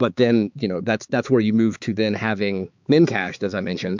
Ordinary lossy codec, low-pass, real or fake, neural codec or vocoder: MP3, 64 kbps; 7.2 kHz; fake; codec, 16 kHz, 4 kbps, X-Codec, HuBERT features, trained on balanced general audio